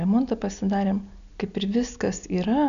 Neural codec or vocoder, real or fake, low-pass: none; real; 7.2 kHz